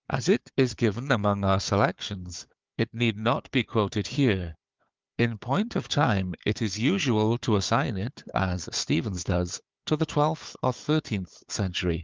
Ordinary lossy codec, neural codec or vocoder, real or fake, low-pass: Opus, 24 kbps; codec, 16 kHz, 4 kbps, FunCodec, trained on Chinese and English, 50 frames a second; fake; 7.2 kHz